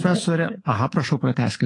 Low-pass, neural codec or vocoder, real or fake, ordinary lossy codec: 10.8 kHz; codec, 24 kHz, 3.1 kbps, DualCodec; fake; AAC, 32 kbps